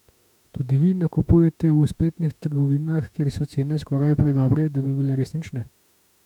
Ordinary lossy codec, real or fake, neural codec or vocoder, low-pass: none; fake; autoencoder, 48 kHz, 32 numbers a frame, DAC-VAE, trained on Japanese speech; 19.8 kHz